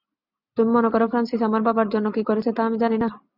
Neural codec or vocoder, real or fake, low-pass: none; real; 5.4 kHz